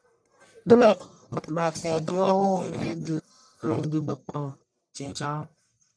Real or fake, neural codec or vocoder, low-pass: fake; codec, 44.1 kHz, 1.7 kbps, Pupu-Codec; 9.9 kHz